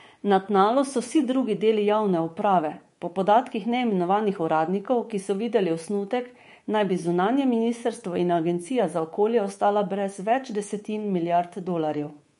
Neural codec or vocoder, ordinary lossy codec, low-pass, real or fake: codec, 24 kHz, 3.1 kbps, DualCodec; MP3, 48 kbps; 10.8 kHz; fake